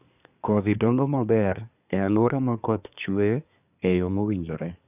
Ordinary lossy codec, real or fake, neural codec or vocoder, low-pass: none; fake; codec, 24 kHz, 1 kbps, SNAC; 3.6 kHz